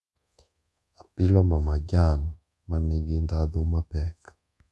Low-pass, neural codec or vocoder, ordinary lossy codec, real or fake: none; codec, 24 kHz, 1.2 kbps, DualCodec; none; fake